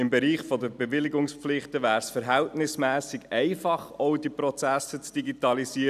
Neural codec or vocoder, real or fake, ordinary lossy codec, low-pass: none; real; none; 14.4 kHz